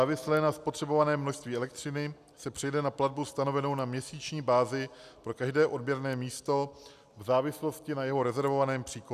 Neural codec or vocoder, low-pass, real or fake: none; 14.4 kHz; real